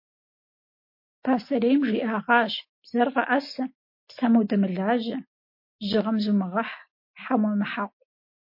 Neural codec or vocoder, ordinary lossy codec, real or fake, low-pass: none; MP3, 32 kbps; real; 5.4 kHz